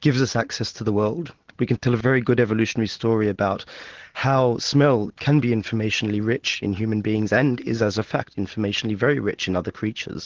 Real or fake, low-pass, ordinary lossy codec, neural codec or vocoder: real; 7.2 kHz; Opus, 16 kbps; none